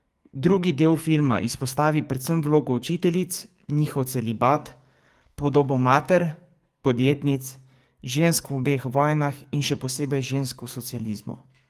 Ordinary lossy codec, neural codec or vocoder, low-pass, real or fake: Opus, 32 kbps; codec, 44.1 kHz, 2.6 kbps, SNAC; 14.4 kHz; fake